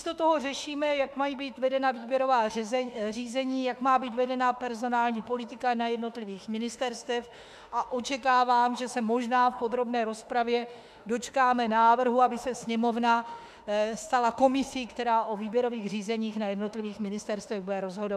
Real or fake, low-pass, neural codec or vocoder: fake; 14.4 kHz; autoencoder, 48 kHz, 32 numbers a frame, DAC-VAE, trained on Japanese speech